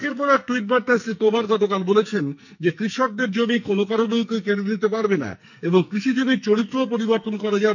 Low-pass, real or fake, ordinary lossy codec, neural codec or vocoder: 7.2 kHz; fake; none; codec, 44.1 kHz, 2.6 kbps, SNAC